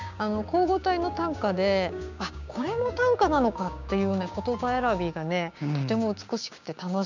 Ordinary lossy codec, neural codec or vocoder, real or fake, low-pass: none; codec, 16 kHz, 6 kbps, DAC; fake; 7.2 kHz